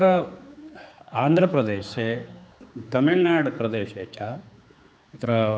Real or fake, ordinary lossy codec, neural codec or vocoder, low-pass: fake; none; codec, 16 kHz, 4 kbps, X-Codec, HuBERT features, trained on general audio; none